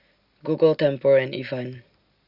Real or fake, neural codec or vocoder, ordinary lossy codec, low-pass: real; none; Opus, 64 kbps; 5.4 kHz